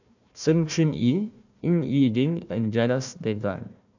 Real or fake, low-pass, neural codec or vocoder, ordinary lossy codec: fake; 7.2 kHz; codec, 16 kHz, 1 kbps, FunCodec, trained on Chinese and English, 50 frames a second; none